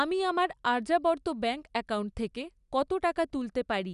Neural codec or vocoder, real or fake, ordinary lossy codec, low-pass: none; real; none; 10.8 kHz